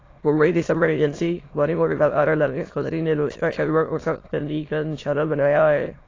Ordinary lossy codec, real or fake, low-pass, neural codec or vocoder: AAC, 32 kbps; fake; 7.2 kHz; autoencoder, 22.05 kHz, a latent of 192 numbers a frame, VITS, trained on many speakers